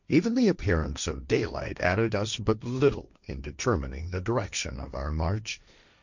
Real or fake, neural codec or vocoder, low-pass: fake; codec, 16 kHz, 1.1 kbps, Voila-Tokenizer; 7.2 kHz